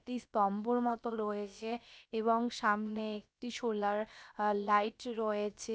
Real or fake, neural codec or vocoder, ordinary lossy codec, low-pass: fake; codec, 16 kHz, about 1 kbps, DyCAST, with the encoder's durations; none; none